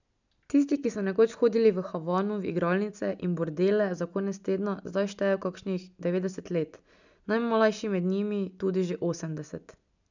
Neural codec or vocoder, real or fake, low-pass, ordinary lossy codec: none; real; 7.2 kHz; none